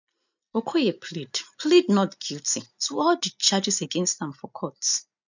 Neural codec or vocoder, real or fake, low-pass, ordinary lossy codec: none; real; 7.2 kHz; none